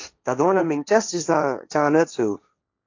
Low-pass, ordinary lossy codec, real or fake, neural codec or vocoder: 7.2 kHz; AAC, 48 kbps; fake; codec, 16 kHz, 1.1 kbps, Voila-Tokenizer